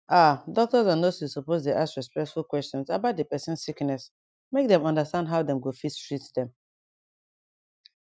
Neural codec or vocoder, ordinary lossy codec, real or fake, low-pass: none; none; real; none